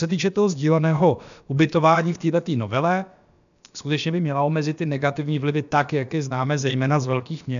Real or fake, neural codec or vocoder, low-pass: fake; codec, 16 kHz, about 1 kbps, DyCAST, with the encoder's durations; 7.2 kHz